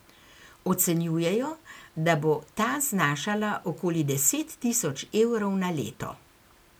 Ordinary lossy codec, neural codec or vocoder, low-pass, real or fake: none; none; none; real